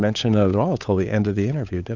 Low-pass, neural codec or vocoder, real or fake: 7.2 kHz; codec, 16 kHz, 8 kbps, FunCodec, trained on Chinese and English, 25 frames a second; fake